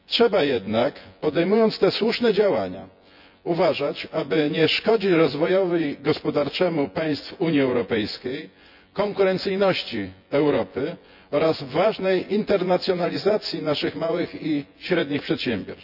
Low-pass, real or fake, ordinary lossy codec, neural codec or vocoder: 5.4 kHz; fake; none; vocoder, 24 kHz, 100 mel bands, Vocos